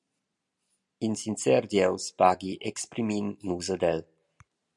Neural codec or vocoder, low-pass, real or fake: none; 10.8 kHz; real